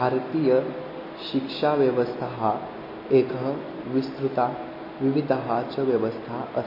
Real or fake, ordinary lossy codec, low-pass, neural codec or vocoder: real; MP3, 24 kbps; 5.4 kHz; none